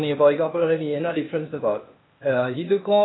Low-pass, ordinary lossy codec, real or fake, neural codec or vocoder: 7.2 kHz; AAC, 16 kbps; fake; codec, 16 kHz, 0.8 kbps, ZipCodec